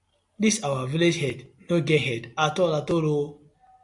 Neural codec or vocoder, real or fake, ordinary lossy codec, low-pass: none; real; AAC, 64 kbps; 10.8 kHz